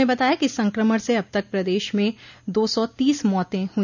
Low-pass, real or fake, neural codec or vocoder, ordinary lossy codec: 7.2 kHz; real; none; none